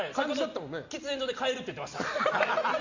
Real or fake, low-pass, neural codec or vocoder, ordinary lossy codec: real; 7.2 kHz; none; Opus, 64 kbps